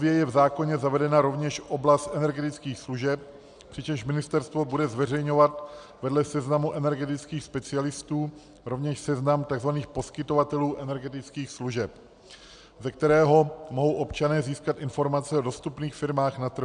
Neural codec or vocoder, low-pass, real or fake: none; 9.9 kHz; real